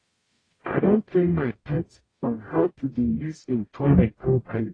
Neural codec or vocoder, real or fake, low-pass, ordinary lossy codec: codec, 44.1 kHz, 0.9 kbps, DAC; fake; 9.9 kHz; AAC, 32 kbps